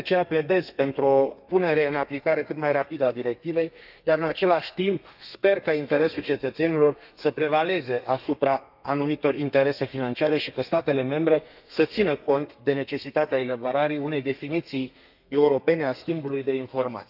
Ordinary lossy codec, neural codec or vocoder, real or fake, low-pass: none; codec, 32 kHz, 1.9 kbps, SNAC; fake; 5.4 kHz